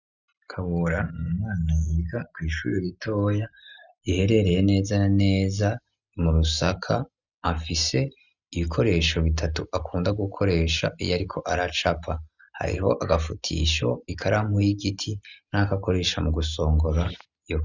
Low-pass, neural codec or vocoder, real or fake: 7.2 kHz; none; real